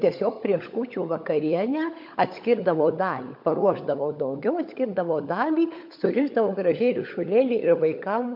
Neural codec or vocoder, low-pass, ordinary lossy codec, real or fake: codec, 16 kHz, 16 kbps, FunCodec, trained on LibriTTS, 50 frames a second; 5.4 kHz; MP3, 48 kbps; fake